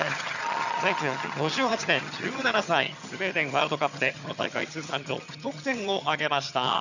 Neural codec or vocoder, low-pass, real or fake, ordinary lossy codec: vocoder, 22.05 kHz, 80 mel bands, HiFi-GAN; 7.2 kHz; fake; none